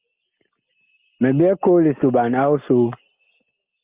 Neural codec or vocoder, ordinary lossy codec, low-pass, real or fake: none; Opus, 32 kbps; 3.6 kHz; real